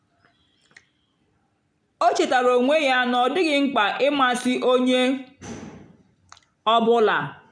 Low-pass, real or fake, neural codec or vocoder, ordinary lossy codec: 9.9 kHz; real; none; none